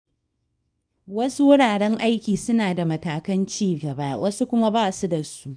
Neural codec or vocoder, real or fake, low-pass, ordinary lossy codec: codec, 24 kHz, 0.9 kbps, WavTokenizer, small release; fake; 9.9 kHz; none